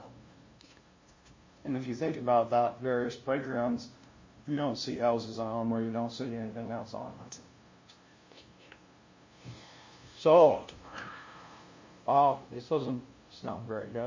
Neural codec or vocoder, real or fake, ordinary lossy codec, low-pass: codec, 16 kHz, 0.5 kbps, FunCodec, trained on LibriTTS, 25 frames a second; fake; MP3, 32 kbps; 7.2 kHz